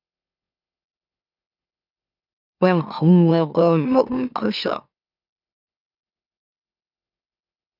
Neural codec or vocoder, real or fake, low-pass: autoencoder, 44.1 kHz, a latent of 192 numbers a frame, MeloTTS; fake; 5.4 kHz